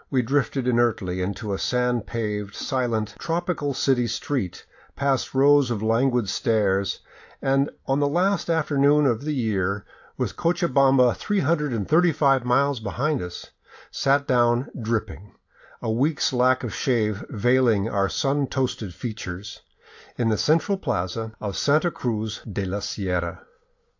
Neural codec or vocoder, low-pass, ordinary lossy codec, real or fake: none; 7.2 kHz; AAC, 48 kbps; real